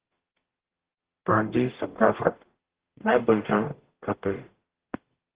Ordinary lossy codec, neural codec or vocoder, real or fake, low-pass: Opus, 16 kbps; codec, 44.1 kHz, 0.9 kbps, DAC; fake; 3.6 kHz